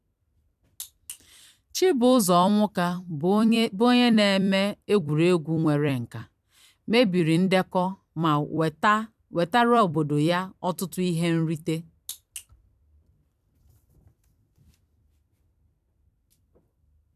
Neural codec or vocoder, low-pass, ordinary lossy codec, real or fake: vocoder, 44.1 kHz, 128 mel bands every 256 samples, BigVGAN v2; 14.4 kHz; none; fake